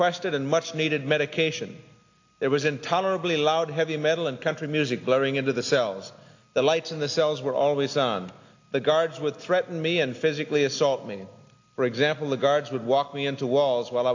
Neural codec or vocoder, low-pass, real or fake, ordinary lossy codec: none; 7.2 kHz; real; AAC, 48 kbps